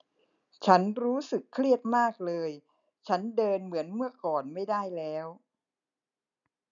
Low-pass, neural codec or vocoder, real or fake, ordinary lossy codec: 7.2 kHz; none; real; none